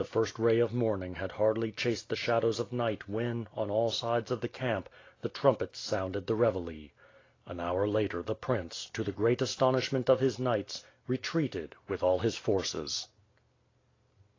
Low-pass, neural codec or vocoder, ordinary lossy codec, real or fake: 7.2 kHz; none; AAC, 32 kbps; real